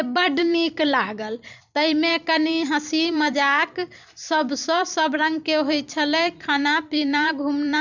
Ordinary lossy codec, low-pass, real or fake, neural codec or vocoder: none; 7.2 kHz; fake; vocoder, 44.1 kHz, 128 mel bands every 256 samples, BigVGAN v2